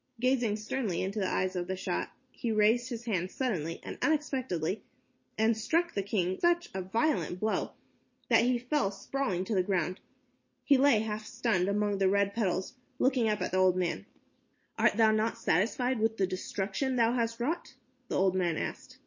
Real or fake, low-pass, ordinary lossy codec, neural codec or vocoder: real; 7.2 kHz; MP3, 32 kbps; none